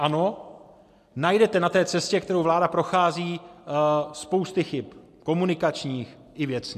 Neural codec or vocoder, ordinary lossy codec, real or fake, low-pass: none; MP3, 64 kbps; real; 14.4 kHz